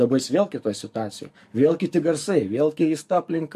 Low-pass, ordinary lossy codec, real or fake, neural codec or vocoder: 14.4 kHz; MP3, 64 kbps; fake; codec, 44.1 kHz, 7.8 kbps, DAC